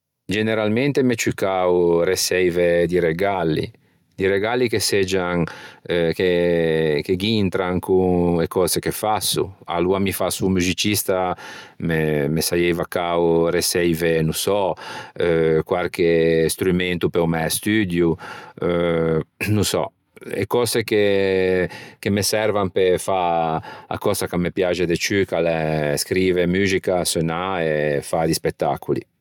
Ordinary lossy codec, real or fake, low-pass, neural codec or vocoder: none; real; 19.8 kHz; none